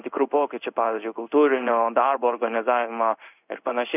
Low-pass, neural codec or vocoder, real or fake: 3.6 kHz; codec, 16 kHz in and 24 kHz out, 1 kbps, XY-Tokenizer; fake